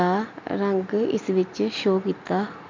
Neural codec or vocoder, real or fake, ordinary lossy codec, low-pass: none; real; MP3, 64 kbps; 7.2 kHz